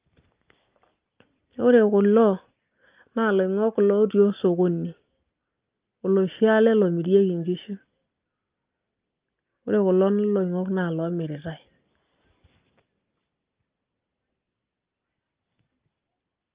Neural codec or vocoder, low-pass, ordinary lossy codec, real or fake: none; 3.6 kHz; Opus, 24 kbps; real